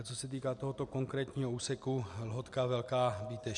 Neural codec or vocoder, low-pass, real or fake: none; 10.8 kHz; real